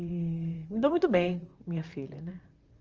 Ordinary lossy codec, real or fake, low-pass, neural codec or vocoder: Opus, 24 kbps; fake; 7.2 kHz; vocoder, 44.1 kHz, 128 mel bands, Pupu-Vocoder